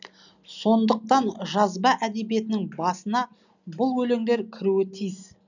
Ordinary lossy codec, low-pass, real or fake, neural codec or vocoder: none; 7.2 kHz; real; none